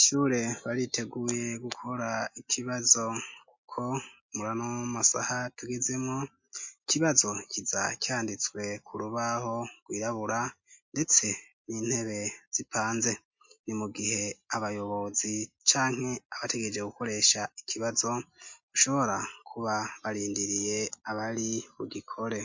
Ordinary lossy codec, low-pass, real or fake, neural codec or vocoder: MP3, 48 kbps; 7.2 kHz; real; none